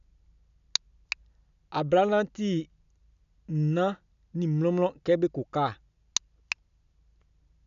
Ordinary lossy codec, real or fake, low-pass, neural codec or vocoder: none; real; 7.2 kHz; none